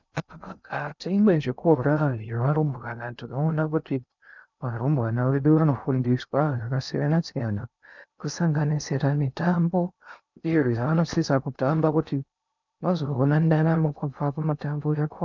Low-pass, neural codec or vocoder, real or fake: 7.2 kHz; codec, 16 kHz in and 24 kHz out, 0.6 kbps, FocalCodec, streaming, 2048 codes; fake